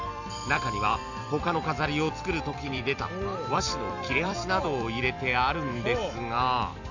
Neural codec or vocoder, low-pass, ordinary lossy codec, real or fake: vocoder, 44.1 kHz, 128 mel bands every 256 samples, BigVGAN v2; 7.2 kHz; none; fake